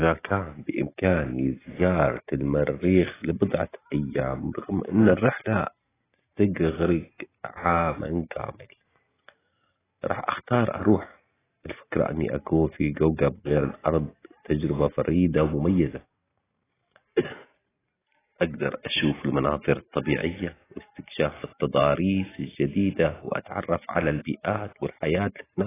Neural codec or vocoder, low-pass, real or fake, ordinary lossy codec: none; 3.6 kHz; real; AAC, 16 kbps